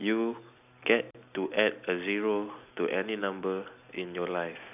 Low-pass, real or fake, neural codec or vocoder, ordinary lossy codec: 3.6 kHz; real; none; none